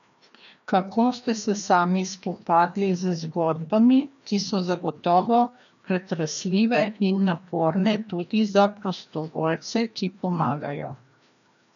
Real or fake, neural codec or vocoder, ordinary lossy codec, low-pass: fake; codec, 16 kHz, 1 kbps, FreqCodec, larger model; none; 7.2 kHz